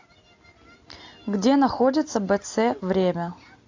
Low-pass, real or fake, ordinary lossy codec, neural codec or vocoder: 7.2 kHz; real; AAC, 48 kbps; none